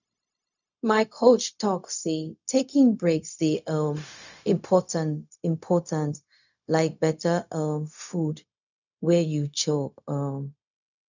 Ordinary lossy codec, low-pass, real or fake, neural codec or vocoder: none; 7.2 kHz; fake; codec, 16 kHz, 0.4 kbps, LongCat-Audio-Codec